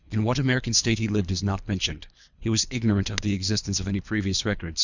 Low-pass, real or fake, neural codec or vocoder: 7.2 kHz; fake; codec, 24 kHz, 3 kbps, HILCodec